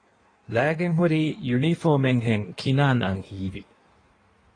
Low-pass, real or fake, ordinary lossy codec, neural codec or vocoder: 9.9 kHz; fake; AAC, 32 kbps; codec, 16 kHz in and 24 kHz out, 1.1 kbps, FireRedTTS-2 codec